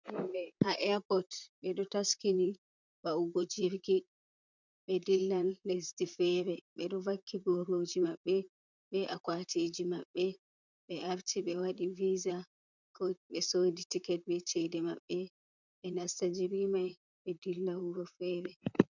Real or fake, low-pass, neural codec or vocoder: fake; 7.2 kHz; vocoder, 44.1 kHz, 128 mel bands, Pupu-Vocoder